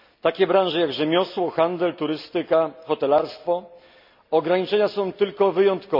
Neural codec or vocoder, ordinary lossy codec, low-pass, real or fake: none; MP3, 48 kbps; 5.4 kHz; real